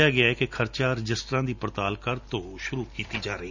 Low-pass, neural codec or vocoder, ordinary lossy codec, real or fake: 7.2 kHz; none; none; real